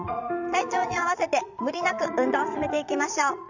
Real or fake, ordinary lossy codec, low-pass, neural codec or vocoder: fake; none; 7.2 kHz; vocoder, 22.05 kHz, 80 mel bands, Vocos